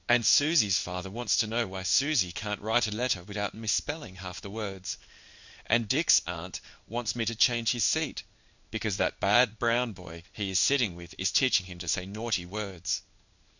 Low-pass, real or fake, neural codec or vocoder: 7.2 kHz; fake; codec, 16 kHz in and 24 kHz out, 1 kbps, XY-Tokenizer